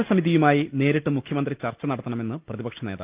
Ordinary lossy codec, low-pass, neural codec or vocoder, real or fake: Opus, 24 kbps; 3.6 kHz; none; real